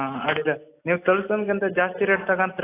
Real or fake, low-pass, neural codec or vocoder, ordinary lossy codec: real; 3.6 kHz; none; AAC, 24 kbps